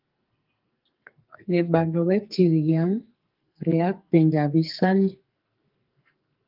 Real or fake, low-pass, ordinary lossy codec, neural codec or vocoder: fake; 5.4 kHz; Opus, 24 kbps; codec, 44.1 kHz, 2.6 kbps, SNAC